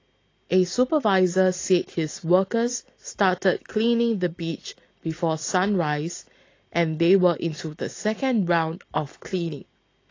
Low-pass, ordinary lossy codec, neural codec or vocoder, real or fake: 7.2 kHz; AAC, 32 kbps; none; real